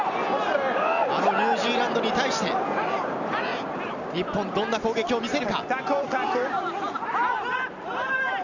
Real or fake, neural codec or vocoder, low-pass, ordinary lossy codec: real; none; 7.2 kHz; none